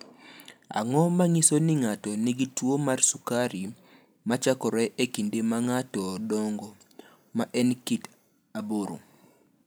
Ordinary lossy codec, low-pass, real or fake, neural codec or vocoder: none; none; real; none